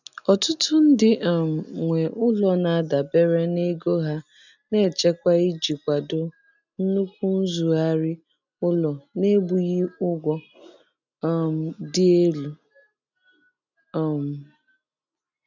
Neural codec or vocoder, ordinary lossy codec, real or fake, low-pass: none; none; real; 7.2 kHz